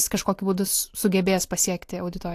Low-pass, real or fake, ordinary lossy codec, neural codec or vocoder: 14.4 kHz; real; AAC, 64 kbps; none